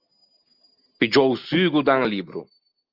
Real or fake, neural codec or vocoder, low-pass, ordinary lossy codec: real; none; 5.4 kHz; Opus, 32 kbps